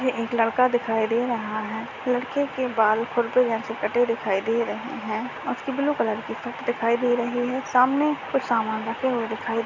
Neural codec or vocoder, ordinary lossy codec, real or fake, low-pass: none; none; real; 7.2 kHz